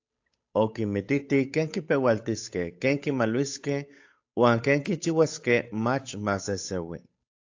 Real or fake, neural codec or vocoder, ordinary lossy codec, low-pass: fake; codec, 16 kHz, 8 kbps, FunCodec, trained on Chinese and English, 25 frames a second; AAC, 48 kbps; 7.2 kHz